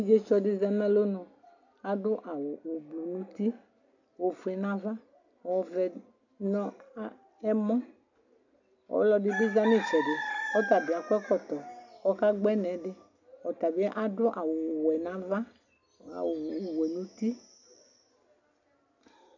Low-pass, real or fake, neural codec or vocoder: 7.2 kHz; real; none